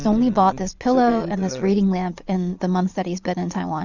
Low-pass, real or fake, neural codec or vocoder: 7.2 kHz; fake; vocoder, 22.05 kHz, 80 mel bands, Vocos